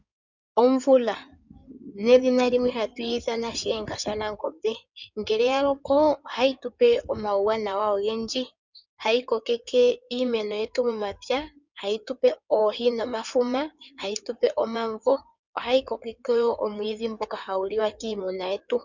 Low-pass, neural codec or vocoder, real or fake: 7.2 kHz; codec, 16 kHz in and 24 kHz out, 2.2 kbps, FireRedTTS-2 codec; fake